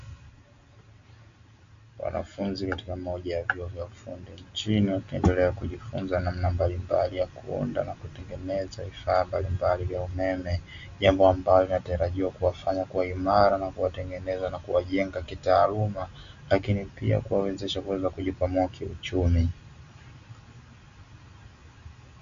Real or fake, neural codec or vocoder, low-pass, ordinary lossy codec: real; none; 7.2 kHz; AAC, 64 kbps